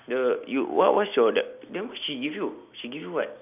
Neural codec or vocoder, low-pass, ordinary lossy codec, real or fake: none; 3.6 kHz; none; real